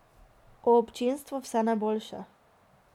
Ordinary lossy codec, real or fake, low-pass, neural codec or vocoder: none; real; 19.8 kHz; none